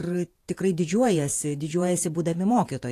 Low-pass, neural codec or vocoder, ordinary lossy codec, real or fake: 14.4 kHz; vocoder, 48 kHz, 128 mel bands, Vocos; AAC, 64 kbps; fake